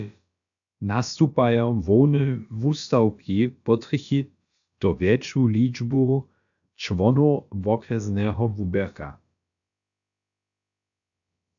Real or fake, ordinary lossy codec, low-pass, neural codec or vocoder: fake; AAC, 64 kbps; 7.2 kHz; codec, 16 kHz, about 1 kbps, DyCAST, with the encoder's durations